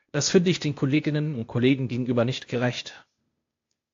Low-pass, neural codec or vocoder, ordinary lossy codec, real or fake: 7.2 kHz; codec, 16 kHz, 0.8 kbps, ZipCodec; AAC, 48 kbps; fake